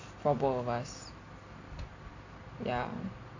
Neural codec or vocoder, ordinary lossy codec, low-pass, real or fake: none; AAC, 48 kbps; 7.2 kHz; real